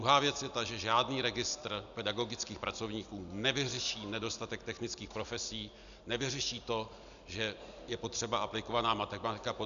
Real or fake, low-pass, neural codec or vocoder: real; 7.2 kHz; none